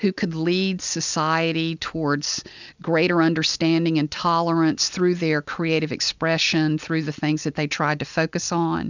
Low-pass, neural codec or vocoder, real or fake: 7.2 kHz; none; real